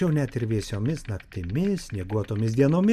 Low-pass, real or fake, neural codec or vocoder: 14.4 kHz; real; none